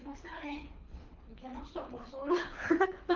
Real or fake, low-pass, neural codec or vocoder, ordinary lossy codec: fake; 7.2 kHz; codec, 24 kHz, 3 kbps, HILCodec; Opus, 32 kbps